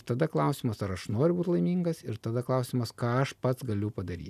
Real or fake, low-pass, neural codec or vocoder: real; 14.4 kHz; none